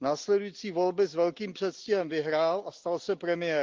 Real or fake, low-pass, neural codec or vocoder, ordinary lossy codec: real; 7.2 kHz; none; Opus, 32 kbps